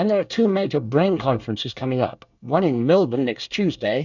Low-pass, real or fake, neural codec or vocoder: 7.2 kHz; fake; codec, 24 kHz, 1 kbps, SNAC